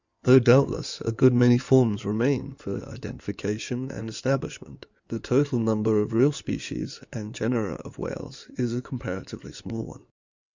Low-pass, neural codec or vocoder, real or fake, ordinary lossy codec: 7.2 kHz; codec, 16 kHz in and 24 kHz out, 2.2 kbps, FireRedTTS-2 codec; fake; Opus, 64 kbps